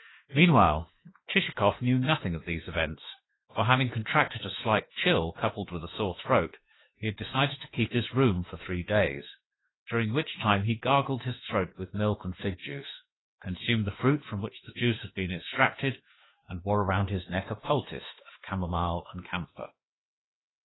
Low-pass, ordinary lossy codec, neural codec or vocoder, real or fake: 7.2 kHz; AAC, 16 kbps; autoencoder, 48 kHz, 32 numbers a frame, DAC-VAE, trained on Japanese speech; fake